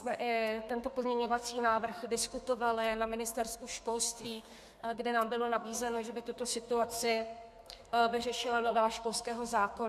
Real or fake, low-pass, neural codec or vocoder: fake; 14.4 kHz; codec, 32 kHz, 1.9 kbps, SNAC